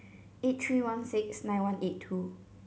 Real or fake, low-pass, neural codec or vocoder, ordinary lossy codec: real; none; none; none